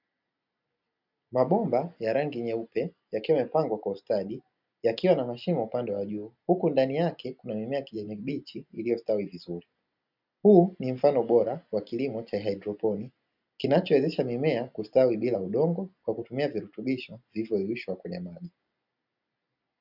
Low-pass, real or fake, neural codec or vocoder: 5.4 kHz; real; none